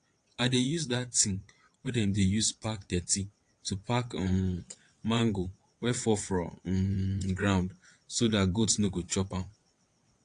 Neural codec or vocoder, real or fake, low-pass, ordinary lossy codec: vocoder, 22.05 kHz, 80 mel bands, WaveNeXt; fake; 9.9 kHz; AAC, 48 kbps